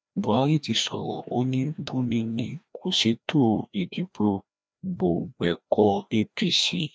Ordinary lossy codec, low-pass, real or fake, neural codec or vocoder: none; none; fake; codec, 16 kHz, 1 kbps, FreqCodec, larger model